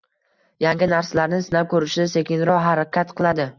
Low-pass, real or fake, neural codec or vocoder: 7.2 kHz; real; none